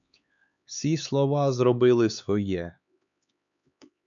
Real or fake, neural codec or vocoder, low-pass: fake; codec, 16 kHz, 4 kbps, X-Codec, HuBERT features, trained on LibriSpeech; 7.2 kHz